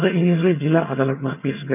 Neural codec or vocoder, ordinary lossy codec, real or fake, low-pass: vocoder, 22.05 kHz, 80 mel bands, HiFi-GAN; none; fake; 3.6 kHz